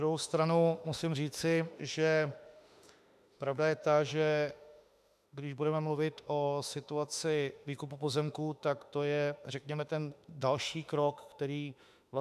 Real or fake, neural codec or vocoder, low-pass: fake; autoencoder, 48 kHz, 32 numbers a frame, DAC-VAE, trained on Japanese speech; 14.4 kHz